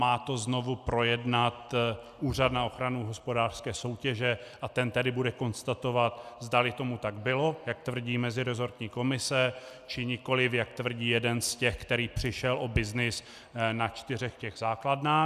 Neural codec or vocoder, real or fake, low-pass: none; real; 14.4 kHz